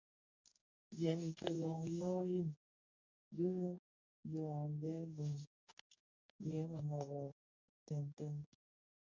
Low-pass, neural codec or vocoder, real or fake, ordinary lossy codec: 7.2 kHz; codec, 44.1 kHz, 2.6 kbps, DAC; fake; MP3, 64 kbps